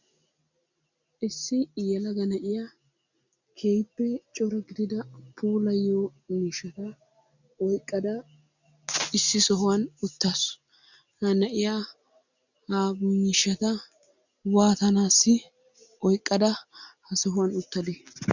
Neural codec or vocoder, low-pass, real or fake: none; 7.2 kHz; real